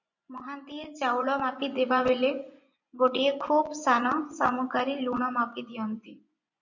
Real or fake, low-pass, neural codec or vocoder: fake; 7.2 kHz; vocoder, 24 kHz, 100 mel bands, Vocos